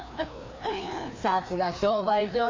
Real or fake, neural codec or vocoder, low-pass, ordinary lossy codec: fake; codec, 16 kHz, 2 kbps, FreqCodec, larger model; 7.2 kHz; MP3, 64 kbps